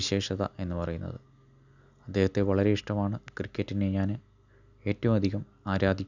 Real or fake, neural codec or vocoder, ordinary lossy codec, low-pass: real; none; none; 7.2 kHz